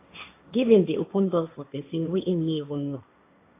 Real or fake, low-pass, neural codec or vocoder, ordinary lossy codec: fake; 3.6 kHz; codec, 16 kHz, 1.1 kbps, Voila-Tokenizer; AAC, 24 kbps